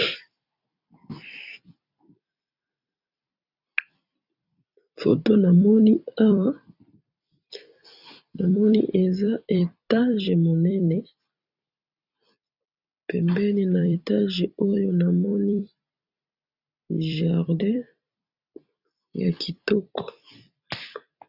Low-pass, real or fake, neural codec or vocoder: 5.4 kHz; fake; vocoder, 44.1 kHz, 128 mel bands every 256 samples, BigVGAN v2